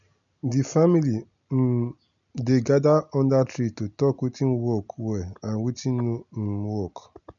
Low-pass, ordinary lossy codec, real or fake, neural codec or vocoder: 7.2 kHz; none; real; none